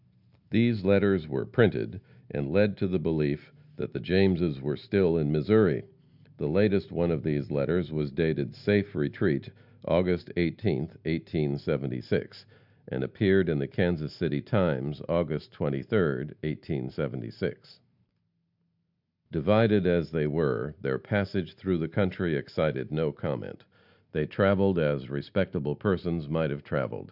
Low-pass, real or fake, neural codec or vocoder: 5.4 kHz; real; none